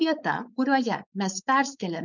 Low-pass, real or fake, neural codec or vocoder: 7.2 kHz; fake; codec, 16 kHz, 4.8 kbps, FACodec